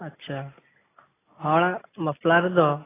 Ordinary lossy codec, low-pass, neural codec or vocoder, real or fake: AAC, 16 kbps; 3.6 kHz; none; real